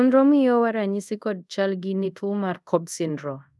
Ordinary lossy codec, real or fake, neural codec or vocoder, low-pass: none; fake; codec, 24 kHz, 0.5 kbps, DualCodec; none